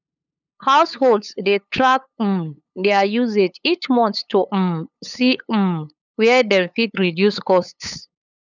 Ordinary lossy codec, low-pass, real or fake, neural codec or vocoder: none; 7.2 kHz; fake; codec, 16 kHz, 8 kbps, FunCodec, trained on LibriTTS, 25 frames a second